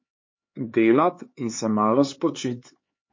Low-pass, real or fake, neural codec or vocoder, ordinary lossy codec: 7.2 kHz; fake; codec, 16 kHz, 4 kbps, X-Codec, HuBERT features, trained on LibriSpeech; MP3, 32 kbps